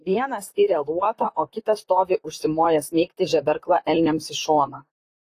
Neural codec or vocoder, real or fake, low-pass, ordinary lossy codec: vocoder, 44.1 kHz, 128 mel bands, Pupu-Vocoder; fake; 14.4 kHz; AAC, 48 kbps